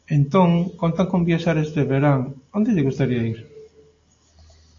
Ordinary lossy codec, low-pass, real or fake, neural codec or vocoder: AAC, 48 kbps; 7.2 kHz; real; none